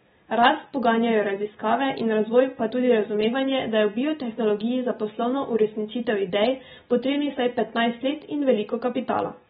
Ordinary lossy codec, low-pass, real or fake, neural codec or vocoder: AAC, 16 kbps; 9.9 kHz; real; none